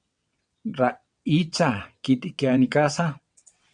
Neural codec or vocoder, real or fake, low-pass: vocoder, 22.05 kHz, 80 mel bands, WaveNeXt; fake; 9.9 kHz